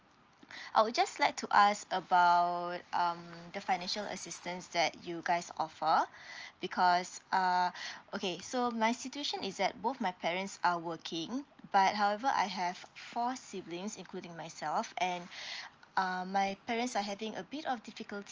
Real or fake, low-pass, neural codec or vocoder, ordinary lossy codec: real; 7.2 kHz; none; Opus, 24 kbps